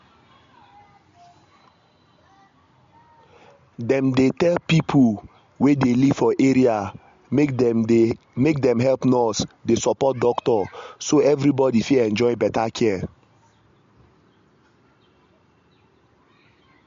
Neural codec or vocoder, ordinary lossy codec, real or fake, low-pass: none; MP3, 48 kbps; real; 7.2 kHz